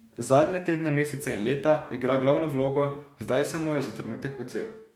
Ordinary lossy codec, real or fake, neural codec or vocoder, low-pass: MP3, 96 kbps; fake; codec, 44.1 kHz, 2.6 kbps, DAC; 19.8 kHz